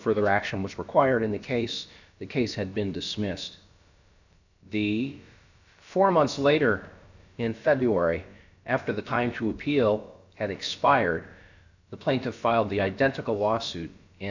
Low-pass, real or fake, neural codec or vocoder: 7.2 kHz; fake; codec, 16 kHz, about 1 kbps, DyCAST, with the encoder's durations